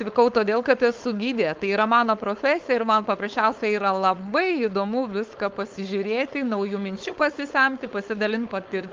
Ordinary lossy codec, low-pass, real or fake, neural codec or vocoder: Opus, 24 kbps; 7.2 kHz; fake; codec, 16 kHz, 4.8 kbps, FACodec